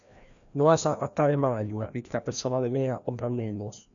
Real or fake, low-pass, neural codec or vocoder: fake; 7.2 kHz; codec, 16 kHz, 1 kbps, FreqCodec, larger model